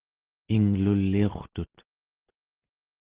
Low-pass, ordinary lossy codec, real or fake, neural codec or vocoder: 3.6 kHz; Opus, 16 kbps; real; none